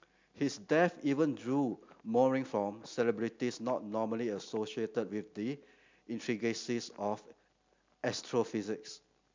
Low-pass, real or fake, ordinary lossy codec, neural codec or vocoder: 7.2 kHz; real; MP3, 64 kbps; none